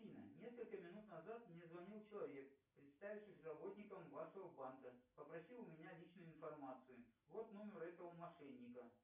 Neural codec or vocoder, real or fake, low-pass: none; real; 3.6 kHz